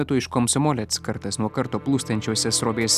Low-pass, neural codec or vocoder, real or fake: 14.4 kHz; none; real